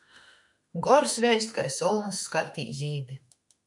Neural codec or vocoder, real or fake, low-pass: autoencoder, 48 kHz, 32 numbers a frame, DAC-VAE, trained on Japanese speech; fake; 10.8 kHz